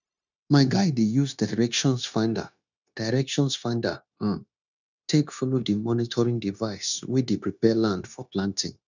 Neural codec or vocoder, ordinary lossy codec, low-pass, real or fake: codec, 16 kHz, 0.9 kbps, LongCat-Audio-Codec; none; 7.2 kHz; fake